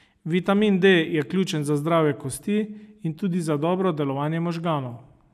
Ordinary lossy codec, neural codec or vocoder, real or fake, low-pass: none; none; real; 14.4 kHz